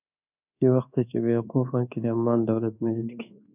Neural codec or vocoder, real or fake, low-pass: codec, 24 kHz, 1.2 kbps, DualCodec; fake; 3.6 kHz